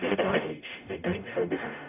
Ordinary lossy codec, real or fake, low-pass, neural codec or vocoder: none; fake; 3.6 kHz; codec, 44.1 kHz, 0.9 kbps, DAC